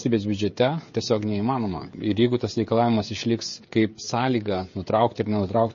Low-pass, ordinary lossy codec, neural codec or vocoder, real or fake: 7.2 kHz; MP3, 32 kbps; codec, 16 kHz, 16 kbps, FreqCodec, smaller model; fake